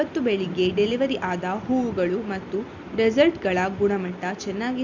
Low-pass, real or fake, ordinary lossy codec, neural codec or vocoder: 7.2 kHz; real; Opus, 64 kbps; none